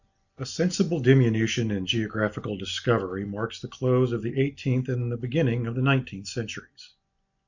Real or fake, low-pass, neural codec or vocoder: real; 7.2 kHz; none